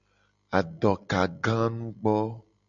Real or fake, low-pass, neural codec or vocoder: real; 7.2 kHz; none